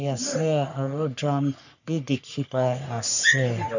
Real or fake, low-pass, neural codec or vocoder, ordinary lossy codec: fake; 7.2 kHz; codec, 44.1 kHz, 3.4 kbps, Pupu-Codec; none